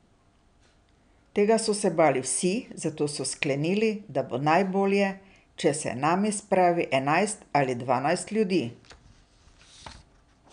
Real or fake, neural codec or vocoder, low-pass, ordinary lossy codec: real; none; 9.9 kHz; none